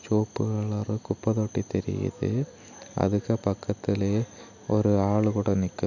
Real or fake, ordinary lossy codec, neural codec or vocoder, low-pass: real; none; none; 7.2 kHz